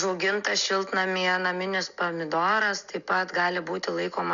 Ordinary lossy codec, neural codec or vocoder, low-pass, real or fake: Opus, 64 kbps; none; 7.2 kHz; real